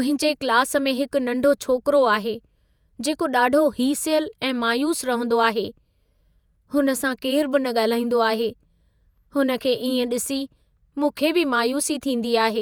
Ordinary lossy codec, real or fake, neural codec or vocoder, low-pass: none; fake; vocoder, 48 kHz, 128 mel bands, Vocos; none